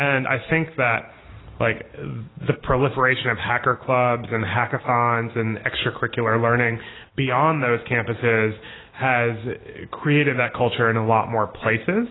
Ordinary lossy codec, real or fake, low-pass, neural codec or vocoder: AAC, 16 kbps; real; 7.2 kHz; none